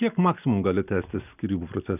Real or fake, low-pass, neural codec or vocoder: real; 3.6 kHz; none